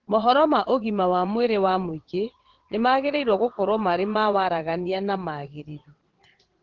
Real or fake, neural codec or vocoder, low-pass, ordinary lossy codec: fake; vocoder, 22.05 kHz, 80 mel bands, WaveNeXt; 7.2 kHz; Opus, 16 kbps